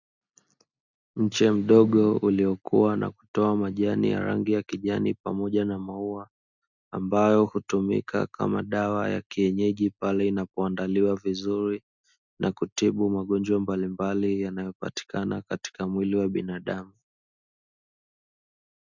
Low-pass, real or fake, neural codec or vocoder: 7.2 kHz; real; none